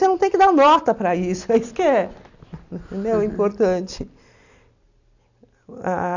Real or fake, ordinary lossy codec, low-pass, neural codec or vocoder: real; none; 7.2 kHz; none